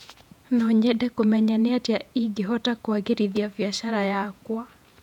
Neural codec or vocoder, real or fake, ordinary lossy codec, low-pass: vocoder, 48 kHz, 128 mel bands, Vocos; fake; none; 19.8 kHz